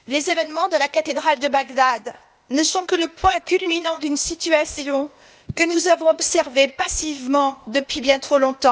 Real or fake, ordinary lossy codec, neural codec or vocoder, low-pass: fake; none; codec, 16 kHz, 0.8 kbps, ZipCodec; none